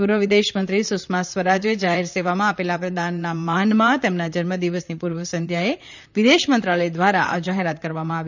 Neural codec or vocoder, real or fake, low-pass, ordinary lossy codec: vocoder, 44.1 kHz, 128 mel bands, Pupu-Vocoder; fake; 7.2 kHz; none